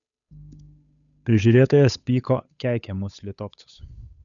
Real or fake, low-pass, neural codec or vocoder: fake; 7.2 kHz; codec, 16 kHz, 8 kbps, FunCodec, trained on Chinese and English, 25 frames a second